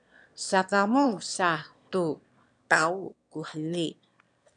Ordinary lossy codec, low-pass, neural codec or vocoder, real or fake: MP3, 96 kbps; 9.9 kHz; autoencoder, 22.05 kHz, a latent of 192 numbers a frame, VITS, trained on one speaker; fake